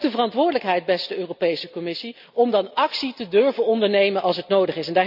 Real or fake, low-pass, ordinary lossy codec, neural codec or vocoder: real; 5.4 kHz; none; none